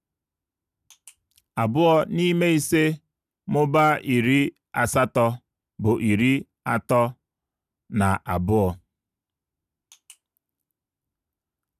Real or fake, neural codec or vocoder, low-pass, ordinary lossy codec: real; none; 14.4 kHz; none